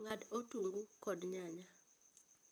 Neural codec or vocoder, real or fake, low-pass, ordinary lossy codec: vocoder, 44.1 kHz, 128 mel bands, Pupu-Vocoder; fake; none; none